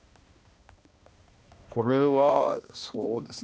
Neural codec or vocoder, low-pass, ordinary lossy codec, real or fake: codec, 16 kHz, 1 kbps, X-Codec, HuBERT features, trained on balanced general audio; none; none; fake